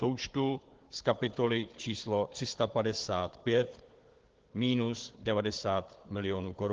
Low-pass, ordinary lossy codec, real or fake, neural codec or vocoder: 7.2 kHz; Opus, 16 kbps; fake; codec, 16 kHz, 4 kbps, FunCodec, trained on Chinese and English, 50 frames a second